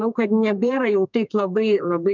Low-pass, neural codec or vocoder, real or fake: 7.2 kHz; codec, 32 kHz, 1.9 kbps, SNAC; fake